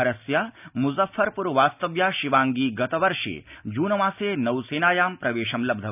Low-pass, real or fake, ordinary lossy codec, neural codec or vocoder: 3.6 kHz; real; none; none